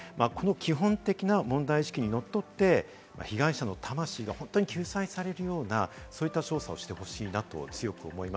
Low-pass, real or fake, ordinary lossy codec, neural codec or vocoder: none; real; none; none